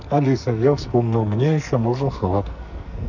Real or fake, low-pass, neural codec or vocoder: fake; 7.2 kHz; codec, 44.1 kHz, 2.6 kbps, SNAC